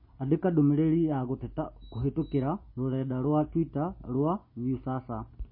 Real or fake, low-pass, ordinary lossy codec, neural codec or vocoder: real; 5.4 kHz; MP3, 24 kbps; none